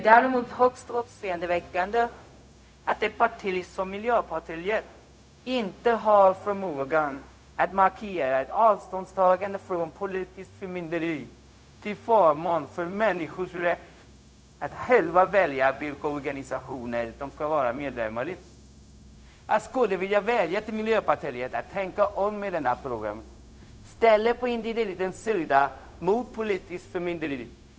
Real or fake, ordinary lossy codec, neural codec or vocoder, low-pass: fake; none; codec, 16 kHz, 0.4 kbps, LongCat-Audio-Codec; none